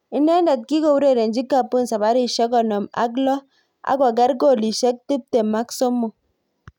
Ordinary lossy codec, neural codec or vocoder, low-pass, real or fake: none; none; 19.8 kHz; real